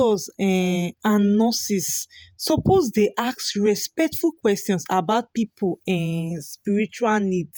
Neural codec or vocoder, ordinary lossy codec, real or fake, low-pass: vocoder, 48 kHz, 128 mel bands, Vocos; none; fake; none